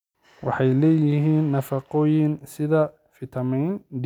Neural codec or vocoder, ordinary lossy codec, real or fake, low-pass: none; none; real; 19.8 kHz